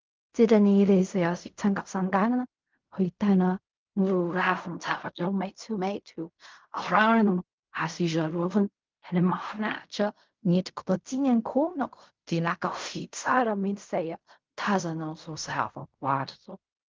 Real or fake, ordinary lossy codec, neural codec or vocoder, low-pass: fake; Opus, 24 kbps; codec, 16 kHz in and 24 kHz out, 0.4 kbps, LongCat-Audio-Codec, fine tuned four codebook decoder; 7.2 kHz